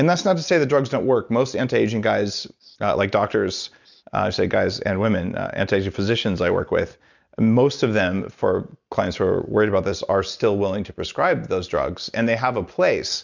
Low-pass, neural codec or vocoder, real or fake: 7.2 kHz; none; real